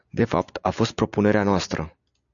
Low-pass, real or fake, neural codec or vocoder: 7.2 kHz; real; none